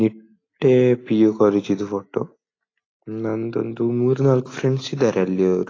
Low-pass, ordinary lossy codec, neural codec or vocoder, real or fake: 7.2 kHz; AAC, 32 kbps; none; real